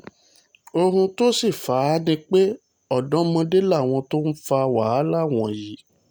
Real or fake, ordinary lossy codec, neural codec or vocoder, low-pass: real; none; none; none